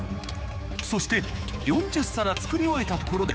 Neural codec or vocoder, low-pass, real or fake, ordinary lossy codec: codec, 16 kHz, 4 kbps, X-Codec, HuBERT features, trained on general audio; none; fake; none